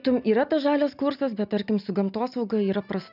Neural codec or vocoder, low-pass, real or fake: none; 5.4 kHz; real